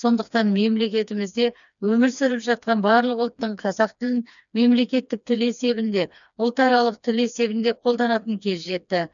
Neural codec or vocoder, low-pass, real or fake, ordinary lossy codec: codec, 16 kHz, 2 kbps, FreqCodec, smaller model; 7.2 kHz; fake; none